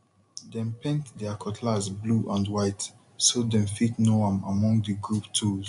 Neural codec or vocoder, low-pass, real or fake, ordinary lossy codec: none; 10.8 kHz; real; none